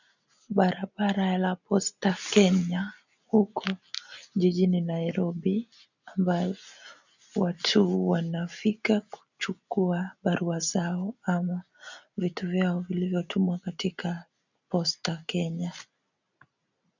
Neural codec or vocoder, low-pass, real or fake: none; 7.2 kHz; real